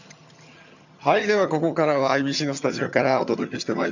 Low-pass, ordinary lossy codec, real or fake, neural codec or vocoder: 7.2 kHz; none; fake; vocoder, 22.05 kHz, 80 mel bands, HiFi-GAN